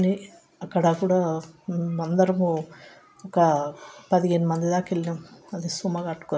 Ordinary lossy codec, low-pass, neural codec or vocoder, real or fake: none; none; none; real